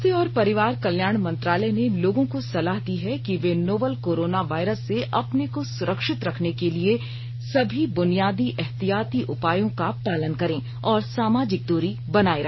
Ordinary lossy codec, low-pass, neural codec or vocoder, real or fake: MP3, 24 kbps; 7.2 kHz; none; real